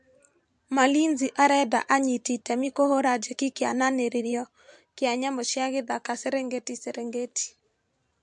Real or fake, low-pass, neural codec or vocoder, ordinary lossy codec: fake; 10.8 kHz; vocoder, 44.1 kHz, 128 mel bands every 512 samples, BigVGAN v2; MP3, 64 kbps